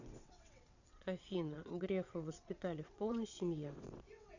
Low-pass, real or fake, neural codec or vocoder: 7.2 kHz; fake; vocoder, 22.05 kHz, 80 mel bands, WaveNeXt